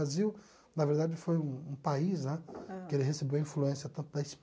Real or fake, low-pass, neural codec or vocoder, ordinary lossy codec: real; none; none; none